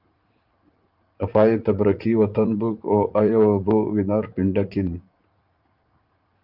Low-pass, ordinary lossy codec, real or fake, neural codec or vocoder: 5.4 kHz; Opus, 24 kbps; fake; vocoder, 44.1 kHz, 80 mel bands, Vocos